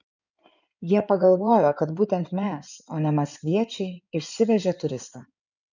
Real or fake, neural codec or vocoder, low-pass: fake; codec, 16 kHz in and 24 kHz out, 2.2 kbps, FireRedTTS-2 codec; 7.2 kHz